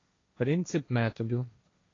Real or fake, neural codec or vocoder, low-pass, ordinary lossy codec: fake; codec, 16 kHz, 1.1 kbps, Voila-Tokenizer; 7.2 kHz; AAC, 32 kbps